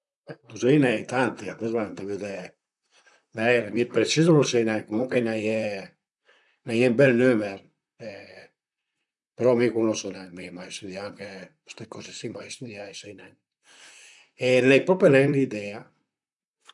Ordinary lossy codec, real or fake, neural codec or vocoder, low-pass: none; fake; vocoder, 44.1 kHz, 128 mel bands, Pupu-Vocoder; 10.8 kHz